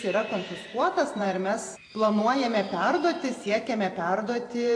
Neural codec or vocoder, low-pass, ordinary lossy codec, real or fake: vocoder, 44.1 kHz, 128 mel bands every 512 samples, BigVGAN v2; 9.9 kHz; AAC, 48 kbps; fake